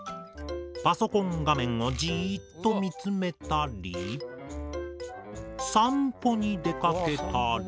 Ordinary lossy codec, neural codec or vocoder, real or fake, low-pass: none; none; real; none